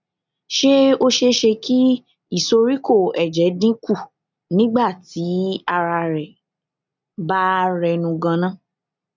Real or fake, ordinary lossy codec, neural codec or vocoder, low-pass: real; none; none; 7.2 kHz